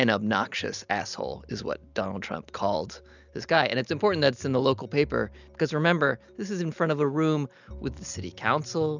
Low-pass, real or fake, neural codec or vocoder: 7.2 kHz; real; none